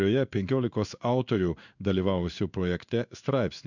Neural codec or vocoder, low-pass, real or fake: codec, 16 kHz in and 24 kHz out, 1 kbps, XY-Tokenizer; 7.2 kHz; fake